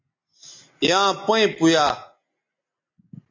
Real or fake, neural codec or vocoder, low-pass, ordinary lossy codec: real; none; 7.2 kHz; MP3, 48 kbps